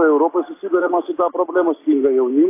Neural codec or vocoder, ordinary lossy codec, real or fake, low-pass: none; AAC, 24 kbps; real; 3.6 kHz